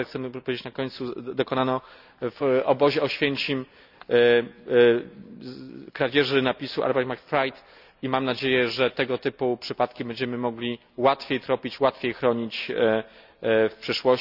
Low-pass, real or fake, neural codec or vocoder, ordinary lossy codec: 5.4 kHz; real; none; none